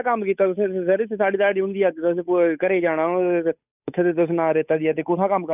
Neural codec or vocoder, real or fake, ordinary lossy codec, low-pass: codec, 16 kHz, 8 kbps, FunCodec, trained on Chinese and English, 25 frames a second; fake; none; 3.6 kHz